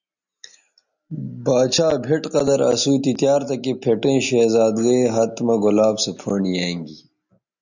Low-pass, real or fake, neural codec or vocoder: 7.2 kHz; real; none